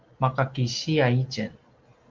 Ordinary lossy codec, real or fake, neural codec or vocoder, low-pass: Opus, 24 kbps; real; none; 7.2 kHz